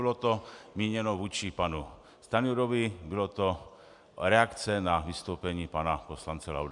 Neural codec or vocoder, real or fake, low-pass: none; real; 10.8 kHz